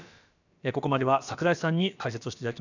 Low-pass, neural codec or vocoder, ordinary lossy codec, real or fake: 7.2 kHz; codec, 16 kHz, about 1 kbps, DyCAST, with the encoder's durations; none; fake